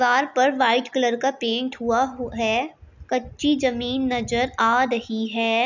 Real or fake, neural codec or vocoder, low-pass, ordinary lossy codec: real; none; 7.2 kHz; none